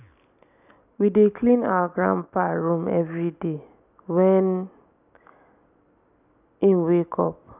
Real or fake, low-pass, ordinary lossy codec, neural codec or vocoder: real; 3.6 kHz; none; none